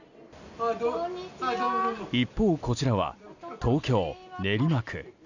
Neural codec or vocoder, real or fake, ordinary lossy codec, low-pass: none; real; none; 7.2 kHz